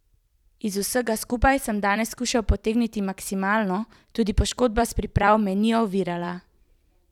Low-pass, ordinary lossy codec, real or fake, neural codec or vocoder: 19.8 kHz; none; fake; vocoder, 44.1 kHz, 128 mel bands every 256 samples, BigVGAN v2